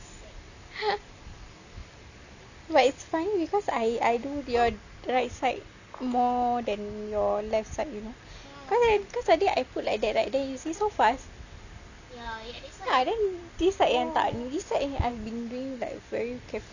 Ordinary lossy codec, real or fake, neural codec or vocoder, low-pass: none; real; none; 7.2 kHz